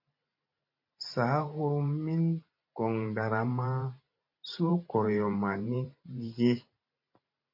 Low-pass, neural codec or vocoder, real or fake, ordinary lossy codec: 5.4 kHz; vocoder, 44.1 kHz, 128 mel bands every 512 samples, BigVGAN v2; fake; MP3, 32 kbps